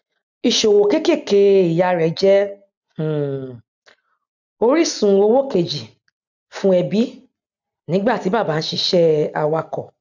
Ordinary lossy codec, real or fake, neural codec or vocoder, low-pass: none; real; none; 7.2 kHz